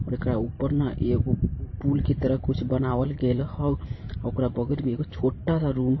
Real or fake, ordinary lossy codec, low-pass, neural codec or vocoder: fake; MP3, 24 kbps; 7.2 kHz; vocoder, 44.1 kHz, 128 mel bands every 512 samples, BigVGAN v2